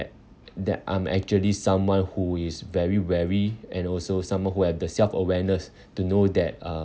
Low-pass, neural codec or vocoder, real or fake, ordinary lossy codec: none; none; real; none